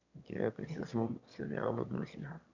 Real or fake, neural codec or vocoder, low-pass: fake; autoencoder, 22.05 kHz, a latent of 192 numbers a frame, VITS, trained on one speaker; 7.2 kHz